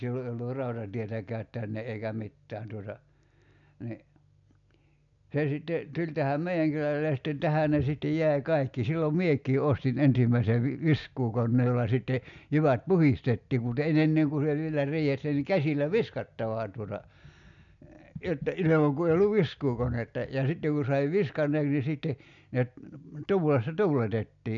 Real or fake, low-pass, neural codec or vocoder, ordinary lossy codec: real; 7.2 kHz; none; MP3, 96 kbps